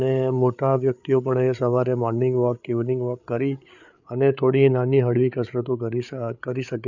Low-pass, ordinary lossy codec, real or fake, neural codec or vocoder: 7.2 kHz; none; fake; codec, 16 kHz, 8 kbps, FreqCodec, larger model